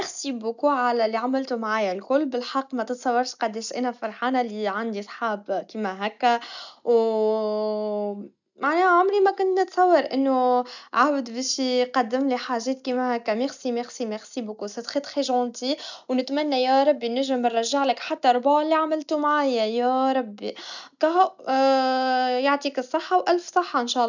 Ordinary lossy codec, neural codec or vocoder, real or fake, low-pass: none; none; real; 7.2 kHz